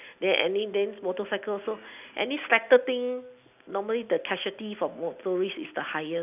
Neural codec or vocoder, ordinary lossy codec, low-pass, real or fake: none; none; 3.6 kHz; real